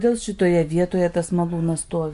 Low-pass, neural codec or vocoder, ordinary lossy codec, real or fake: 10.8 kHz; none; MP3, 64 kbps; real